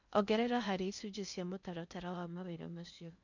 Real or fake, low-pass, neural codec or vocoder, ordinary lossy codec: fake; 7.2 kHz; codec, 16 kHz in and 24 kHz out, 0.8 kbps, FocalCodec, streaming, 65536 codes; none